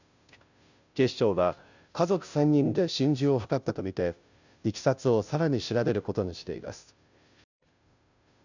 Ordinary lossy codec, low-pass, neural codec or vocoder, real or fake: none; 7.2 kHz; codec, 16 kHz, 0.5 kbps, FunCodec, trained on Chinese and English, 25 frames a second; fake